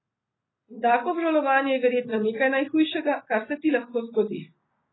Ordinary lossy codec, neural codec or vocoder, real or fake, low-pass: AAC, 16 kbps; none; real; 7.2 kHz